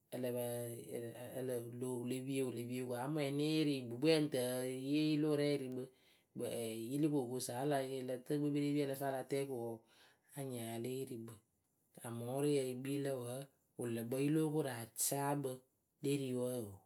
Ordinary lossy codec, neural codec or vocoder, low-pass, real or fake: none; none; none; real